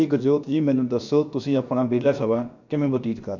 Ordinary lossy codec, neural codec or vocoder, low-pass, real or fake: none; codec, 16 kHz, about 1 kbps, DyCAST, with the encoder's durations; 7.2 kHz; fake